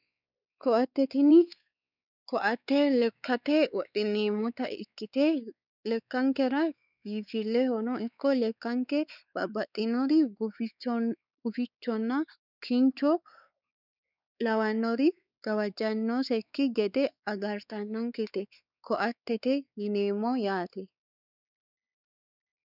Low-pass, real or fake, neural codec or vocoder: 5.4 kHz; fake; codec, 16 kHz, 4 kbps, X-Codec, WavLM features, trained on Multilingual LibriSpeech